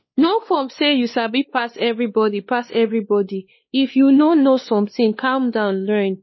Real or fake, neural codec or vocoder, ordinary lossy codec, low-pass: fake; codec, 16 kHz, 2 kbps, X-Codec, WavLM features, trained on Multilingual LibriSpeech; MP3, 24 kbps; 7.2 kHz